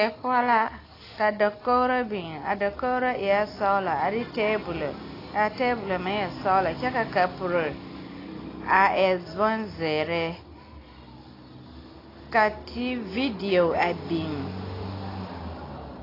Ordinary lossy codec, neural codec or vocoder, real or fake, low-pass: AAC, 24 kbps; none; real; 5.4 kHz